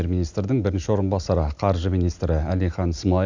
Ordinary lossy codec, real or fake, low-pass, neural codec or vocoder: Opus, 64 kbps; real; 7.2 kHz; none